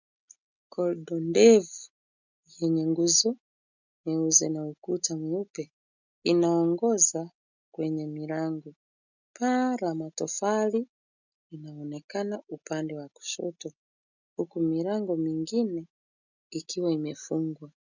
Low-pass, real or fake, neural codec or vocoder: 7.2 kHz; real; none